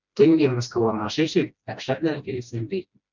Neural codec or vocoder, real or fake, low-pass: codec, 16 kHz, 1 kbps, FreqCodec, smaller model; fake; 7.2 kHz